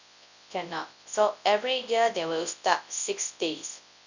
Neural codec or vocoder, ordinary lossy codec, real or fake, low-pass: codec, 24 kHz, 0.9 kbps, WavTokenizer, large speech release; none; fake; 7.2 kHz